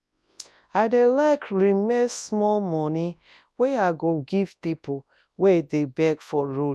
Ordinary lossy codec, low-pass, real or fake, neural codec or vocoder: none; none; fake; codec, 24 kHz, 0.9 kbps, WavTokenizer, large speech release